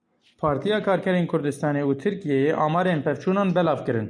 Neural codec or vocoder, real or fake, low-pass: none; real; 9.9 kHz